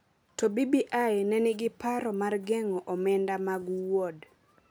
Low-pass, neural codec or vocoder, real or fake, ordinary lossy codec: none; none; real; none